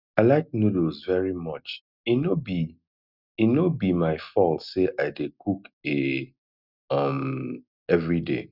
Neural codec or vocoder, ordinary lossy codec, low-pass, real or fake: none; none; 5.4 kHz; real